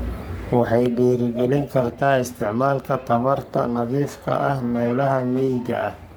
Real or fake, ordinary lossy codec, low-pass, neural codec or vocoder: fake; none; none; codec, 44.1 kHz, 3.4 kbps, Pupu-Codec